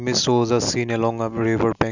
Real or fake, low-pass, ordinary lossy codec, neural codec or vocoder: real; 7.2 kHz; none; none